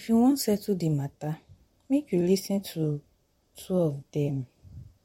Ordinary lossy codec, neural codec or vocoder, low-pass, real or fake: MP3, 64 kbps; vocoder, 44.1 kHz, 128 mel bands, Pupu-Vocoder; 19.8 kHz; fake